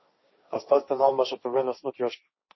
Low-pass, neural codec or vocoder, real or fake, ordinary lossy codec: 7.2 kHz; codec, 16 kHz, 1.1 kbps, Voila-Tokenizer; fake; MP3, 24 kbps